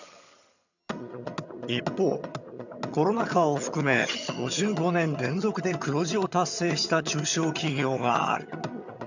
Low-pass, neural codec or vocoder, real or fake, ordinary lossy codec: 7.2 kHz; vocoder, 22.05 kHz, 80 mel bands, HiFi-GAN; fake; none